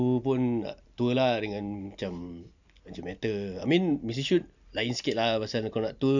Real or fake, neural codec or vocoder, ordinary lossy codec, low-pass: real; none; none; 7.2 kHz